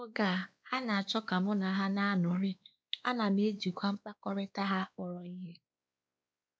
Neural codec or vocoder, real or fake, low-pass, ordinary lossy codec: codec, 16 kHz, 2 kbps, X-Codec, WavLM features, trained on Multilingual LibriSpeech; fake; none; none